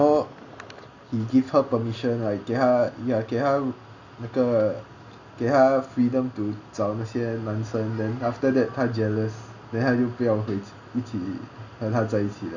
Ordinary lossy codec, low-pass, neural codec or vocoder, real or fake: Opus, 64 kbps; 7.2 kHz; none; real